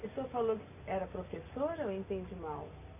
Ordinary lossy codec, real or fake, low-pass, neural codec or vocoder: none; real; 3.6 kHz; none